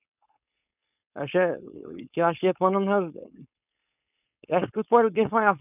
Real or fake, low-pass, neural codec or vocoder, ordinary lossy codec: fake; 3.6 kHz; codec, 16 kHz, 4.8 kbps, FACodec; none